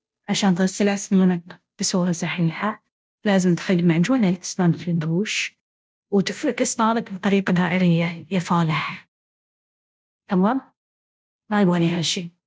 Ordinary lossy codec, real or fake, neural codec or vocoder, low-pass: none; fake; codec, 16 kHz, 0.5 kbps, FunCodec, trained on Chinese and English, 25 frames a second; none